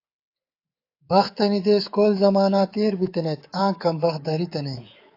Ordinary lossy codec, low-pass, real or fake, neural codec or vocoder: AAC, 32 kbps; 5.4 kHz; fake; codec, 24 kHz, 3.1 kbps, DualCodec